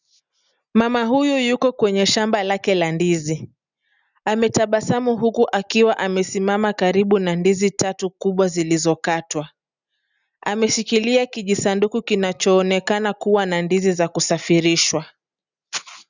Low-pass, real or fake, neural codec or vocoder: 7.2 kHz; real; none